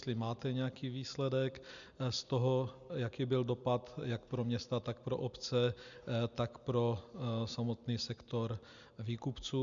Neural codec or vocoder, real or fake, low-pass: none; real; 7.2 kHz